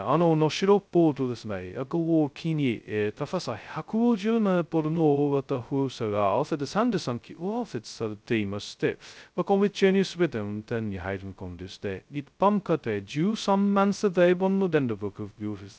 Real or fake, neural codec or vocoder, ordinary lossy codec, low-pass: fake; codec, 16 kHz, 0.2 kbps, FocalCodec; none; none